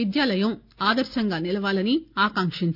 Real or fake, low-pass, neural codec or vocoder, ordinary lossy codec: real; 5.4 kHz; none; none